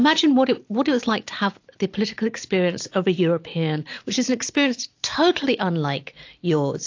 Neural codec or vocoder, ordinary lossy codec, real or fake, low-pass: none; AAC, 48 kbps; real; 7.2 kHz